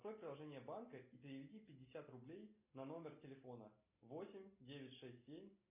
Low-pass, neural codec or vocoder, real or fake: 3.6 kHz; none; real